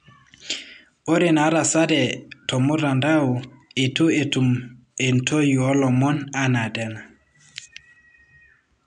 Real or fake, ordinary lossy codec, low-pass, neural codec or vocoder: real; none; 9.9 kHz; none